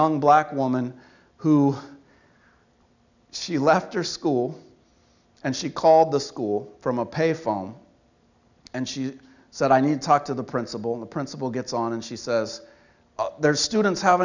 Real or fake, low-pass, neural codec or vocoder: real; 7.2 kHz; none